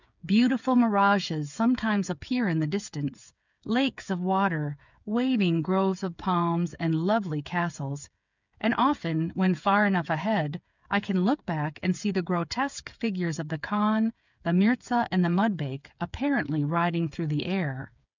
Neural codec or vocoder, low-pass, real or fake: codec, 16 kHz, 8 kbps, FreqCodec, smaller model; 7.2 kHz; fake